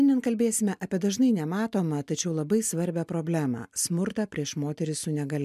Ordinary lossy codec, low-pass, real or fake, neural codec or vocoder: MP3, 96 kbps; 14.4 kHz; real; none